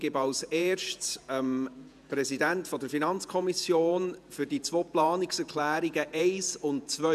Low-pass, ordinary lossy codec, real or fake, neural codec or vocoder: 14.4 kHz; none; fake; vocoder, 48 kHz, 128 mel bands, Vocos